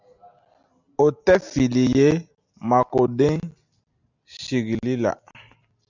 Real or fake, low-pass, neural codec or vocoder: real; 7.2 kHz; none